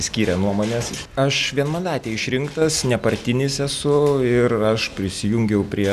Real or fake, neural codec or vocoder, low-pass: real; none; 14.4 kHz